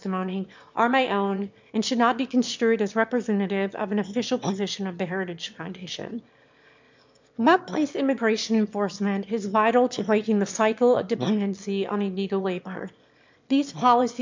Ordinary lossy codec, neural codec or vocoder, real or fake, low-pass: MP3, 64 kbps; autoencoder, 22.05 kHz, a latent of 192 numbers a frame, VITS, trained on one speaker; fake; 7.2 kHz